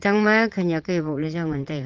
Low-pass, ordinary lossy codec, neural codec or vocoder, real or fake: 7.2 kHz; Opus, 16 kbps; vocoder, 44.1 kHz, 80 mel bands, Vocos; fake